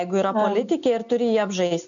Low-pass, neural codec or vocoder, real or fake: 7.2 kHz; none; real